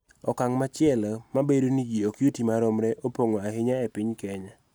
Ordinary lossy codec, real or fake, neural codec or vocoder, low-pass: none; real; none; none